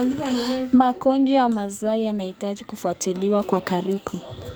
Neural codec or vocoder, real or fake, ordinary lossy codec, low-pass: codec, 44.1 kHz, 2.6 kbps, SNAC; fake; none; none